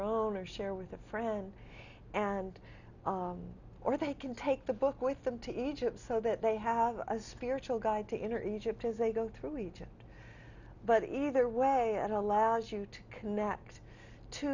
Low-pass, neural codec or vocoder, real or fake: 7.2 kHz; none; real